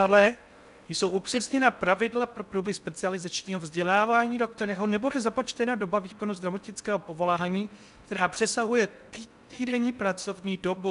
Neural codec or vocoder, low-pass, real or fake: codec, 16 kHz in and 24 kHz out, 0.6 kbps, FocalCodec, streaming, 4096 codes; 10.8 kHz; fake